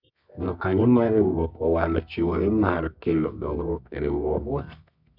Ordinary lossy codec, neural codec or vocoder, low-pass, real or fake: none; codec, 24 kHz, 0.9 kbps, WavTokenizer, medium music audio release; 5.4 kHz; fake